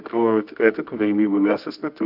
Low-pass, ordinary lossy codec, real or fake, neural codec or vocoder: 5.4 kHz; AAC, 48 kbps; fake; codec, 24 kHz, 0.9 kbps, WavTokenizer, medium music audio release